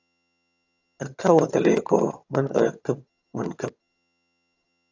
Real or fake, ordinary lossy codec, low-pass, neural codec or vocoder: fake; AAC, 48 kbps; 7.2 kHz; vocoder, 22.05 kHz, 80 mel bands, HiFi-GAN